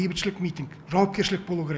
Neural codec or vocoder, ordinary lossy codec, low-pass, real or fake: none; none; none; real